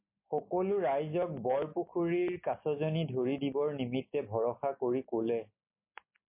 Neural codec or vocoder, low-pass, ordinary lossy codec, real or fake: none; 3.6 kHz; MP3, 24 kbps; real